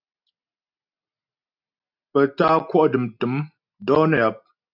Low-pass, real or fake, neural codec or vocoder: 5.4 kHz; real; none